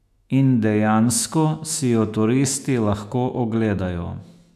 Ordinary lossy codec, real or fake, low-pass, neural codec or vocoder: none; fake; 14.4 kHz; autoencoder, 48 kHz, 128 numbers a frame, DAC-VAE, trained on Japanese speech